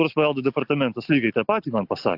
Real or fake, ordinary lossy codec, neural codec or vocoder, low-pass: real; AAC, 48 kbps; none; 5.4 kHz